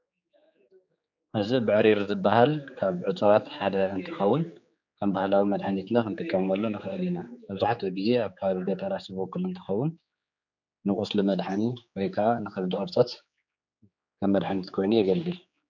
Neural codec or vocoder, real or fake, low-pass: codec, 16 kHz, 4 kbps, X-Codec, HuBERT features, trained on general audio; fake; 7.2 kHz